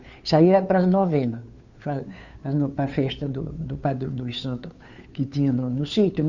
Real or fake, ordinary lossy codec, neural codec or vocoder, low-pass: fake; Opus, 64 kbps; codec, 16 kHz, 2 kbps, FunCodec, trained on Chinese and English, 25 frames a second; 7.2 kHz